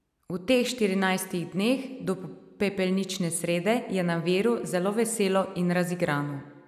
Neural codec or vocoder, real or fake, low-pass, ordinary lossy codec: none; real; 14.4 kHz; none